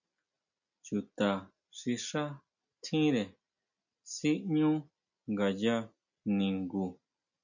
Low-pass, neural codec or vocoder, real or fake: 7.2 kHz; none; real